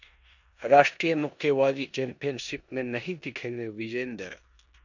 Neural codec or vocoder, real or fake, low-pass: codec, 16 kHz in and 24 kHz out, 0.9 kbps, LongCat-Audio-Codec, four codebook decoder; fake; 7.2 kHz